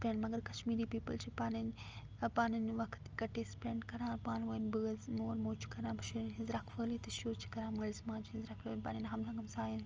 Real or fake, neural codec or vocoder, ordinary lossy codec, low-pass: real; none; Opus, 24 kbps; 7.2 kHz